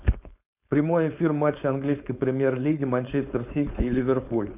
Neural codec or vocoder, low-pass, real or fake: codec, 16 kHz, 4.8 kbps, FACodec; 3.6 kHz; fake